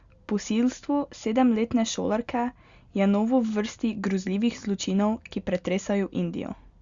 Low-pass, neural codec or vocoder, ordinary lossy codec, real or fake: 7.2 kHz; none; Opus, 64 kbps; real